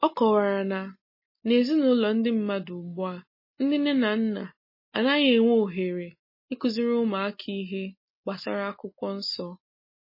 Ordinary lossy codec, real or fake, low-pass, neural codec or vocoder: MP3, 24 kbps; real; 5.4 kHz; none